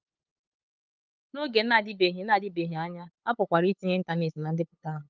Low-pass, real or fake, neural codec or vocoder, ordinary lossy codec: 7.2 kHz; fake; codec, 16 kHz, 8 kbps, FunCodec, trained on LibriTTS, 25 frames a second; Opus, 24 kbps